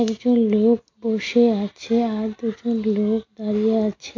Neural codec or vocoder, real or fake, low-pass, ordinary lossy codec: none; real; 7.2 kHz; MP3, 48 kbps